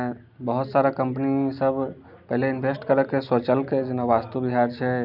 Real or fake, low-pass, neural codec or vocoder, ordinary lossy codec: real; 5.4 kHz; none; none